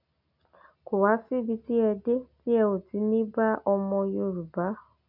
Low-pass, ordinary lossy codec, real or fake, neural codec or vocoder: 5.4 kHz; none; real; none